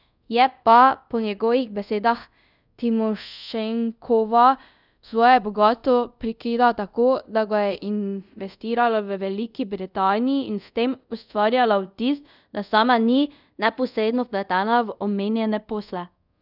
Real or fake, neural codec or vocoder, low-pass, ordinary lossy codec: fake; codec, 24 kHz, 0.5 kbps, DualCodec; 5.4 kHz; none